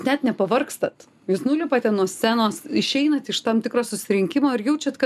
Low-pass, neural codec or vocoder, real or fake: 14.4 kHz; none; real